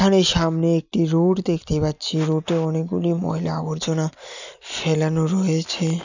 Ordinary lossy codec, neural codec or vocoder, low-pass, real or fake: none; none; 7.2 kHz; real